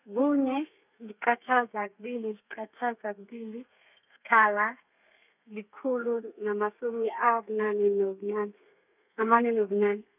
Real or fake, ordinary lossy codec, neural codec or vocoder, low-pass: fake; none; codec, 32 kHz, 1.9 kbps, SNAC; 3.6 kHz